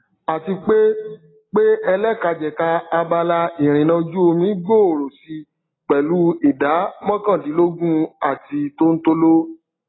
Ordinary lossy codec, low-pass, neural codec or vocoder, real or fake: AAC, 16 kbps; 7.2 kHz; none; real